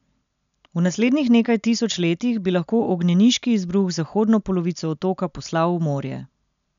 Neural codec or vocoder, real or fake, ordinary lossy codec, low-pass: none; real; none; 7.2 kHz